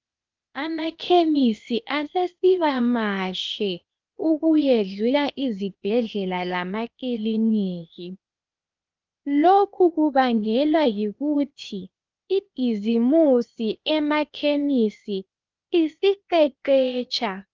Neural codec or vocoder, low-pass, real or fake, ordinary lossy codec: codec, 16 kHz, 0.8 kbps, ZipCodec; 7.2 kHz; fake; Opus, 24 kbps